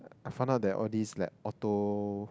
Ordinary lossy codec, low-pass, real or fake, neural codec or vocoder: none; none; real; none